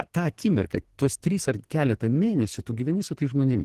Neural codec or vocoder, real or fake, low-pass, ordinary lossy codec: codec, 44.1 kHz, 2.6 kbps, SNAC; fake; 14.4 kHz; Opus, 16 kbps